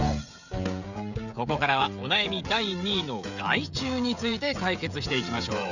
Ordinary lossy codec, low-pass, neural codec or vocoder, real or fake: none; 7.2 kHz; codec, 16 kHz, 16 kbps, FreqCodec, smaller model; fake